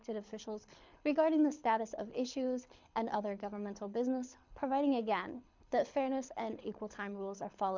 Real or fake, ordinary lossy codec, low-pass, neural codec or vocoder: fake; MP3, 64 kbps; 7.2 kHz; codec, 24 kHz, 6 kbps, HILCodec